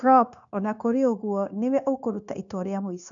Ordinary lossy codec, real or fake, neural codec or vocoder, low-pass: none; fake; codec, 16 kHz, 0.9 kbps, LongCat-Audio-Codec; 7.2 kHz